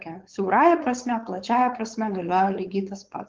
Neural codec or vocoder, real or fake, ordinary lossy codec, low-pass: codec, 16 kHz, 4.8 kbps, FACodec; fake; Opus, 16 kbps; 7.2 kHz